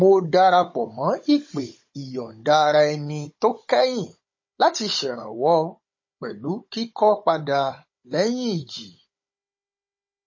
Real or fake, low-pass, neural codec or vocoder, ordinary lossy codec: fake; 7.2 kHz; codec, 16 kHz, 16 kbps, FunCodec, trained on Chinese and English, 50 frames a second; MP3, 32 kbps